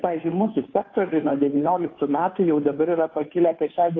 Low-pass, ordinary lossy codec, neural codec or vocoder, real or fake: 7.2 kHz; AAC, 32 kbps; codec, 16 kHz, 2 kbps, FunCodec, trained on Chinese and English, 25 frames a second; fake